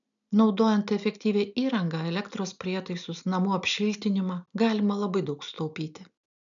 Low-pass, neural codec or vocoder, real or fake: 7.2 kHz; none; real